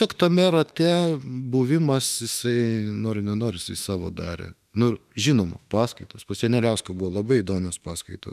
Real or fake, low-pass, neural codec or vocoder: fake; 14.4 kHz; autoencoder, 48 kHz, 32 numbers a frame, DAC-VAE, trained on Japanese speech